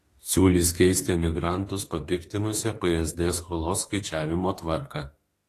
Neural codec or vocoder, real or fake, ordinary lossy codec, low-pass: autoencoder, 48 kHz, 32 numbers a frame, DAC-VAE, trained on Japanese speech; fake; AAC, 48 kbps; 14.4 kHz